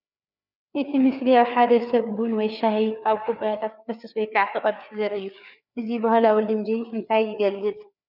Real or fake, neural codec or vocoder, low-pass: fake; codec, 16 kHz, 4 kbps, FreqCodec, larger model; 5.4 kHz